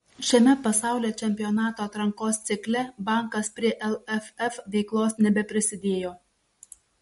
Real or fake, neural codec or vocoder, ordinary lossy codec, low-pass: real; none; MP3, 48 kbps; 19.8 kHz